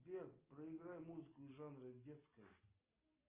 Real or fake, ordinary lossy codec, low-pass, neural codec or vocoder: real; Opus, 24 kbps; 3.6 kHz; none